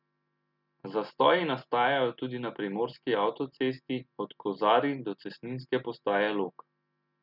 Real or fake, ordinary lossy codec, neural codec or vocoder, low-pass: real; none; none; 5.4 kHz